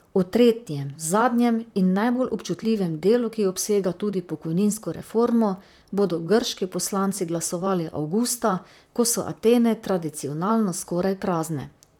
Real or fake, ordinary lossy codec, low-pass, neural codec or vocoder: fake; none; 19.8 kHz; vocoder, 44.1 kHz, 128 mel bands, Pupu-Vocoder